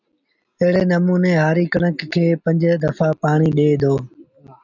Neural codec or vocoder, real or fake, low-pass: none; real; 7.2 kHz